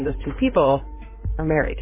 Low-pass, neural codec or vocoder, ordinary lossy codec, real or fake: 3.6 kHz; codec, 16 kHz in and 24 kHz out, 2.2 kbps, FireRedTTS-2 codec; MP3, 16 kbps; fake